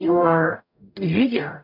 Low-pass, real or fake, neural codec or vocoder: 5.4 kHz; fake; codec, 44.1 kHz, 0.9 kbps, DAC